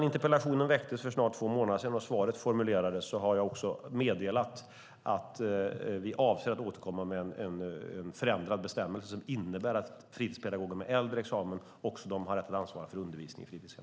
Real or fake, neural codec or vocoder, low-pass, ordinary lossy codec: real; none; none; none